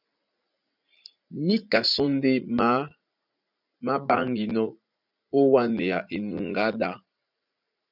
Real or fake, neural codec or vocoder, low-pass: fake; vocoder, 44.1 kHz, 80 mel bands, Vocos; 5.4 kHz